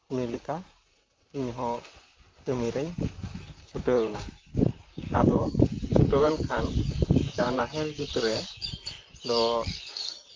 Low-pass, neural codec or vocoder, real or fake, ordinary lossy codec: 7.2 kHz; vocoder, 44.1 kHz, 128 mel bands, Pupu-Vocoder; fake; Opus, 24 kbps